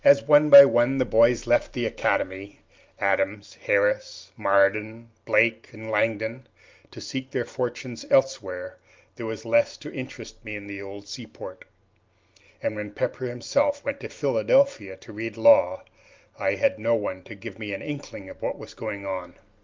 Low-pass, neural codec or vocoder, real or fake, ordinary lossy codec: 7.2 kHz; none; real; Opus, 32 kbps